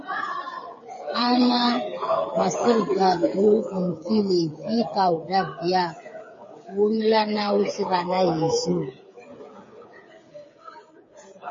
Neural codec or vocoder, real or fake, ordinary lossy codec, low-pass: codec, 16 kHz, 8 kbps, FreqCodec, smaller model; fake; MP3, 32 kbps; 7.2 kHz